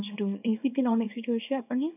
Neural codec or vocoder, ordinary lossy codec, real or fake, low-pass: codec, 24 kHz, 0.9 kbps, WavTokenizer, small release; none; fake; 3.6 kHz